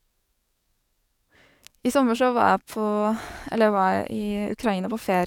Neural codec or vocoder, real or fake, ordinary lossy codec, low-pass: codec, 44.1 kHz, 7.8 kbps, DAC; fake; none; 19.8 kHz